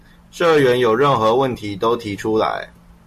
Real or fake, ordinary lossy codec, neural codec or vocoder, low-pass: real; MP3, 64 kbps; none; 14.4 kHz